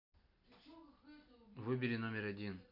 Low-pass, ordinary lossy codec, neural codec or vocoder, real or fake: 5.4 kHz; none; none; real